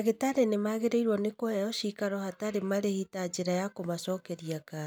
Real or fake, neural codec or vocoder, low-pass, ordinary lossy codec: real; none; none; none